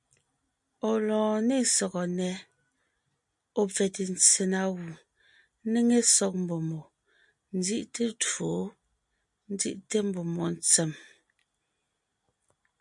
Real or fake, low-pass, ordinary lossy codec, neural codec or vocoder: real; 10.8 kHz; MP3, 64 kbps; none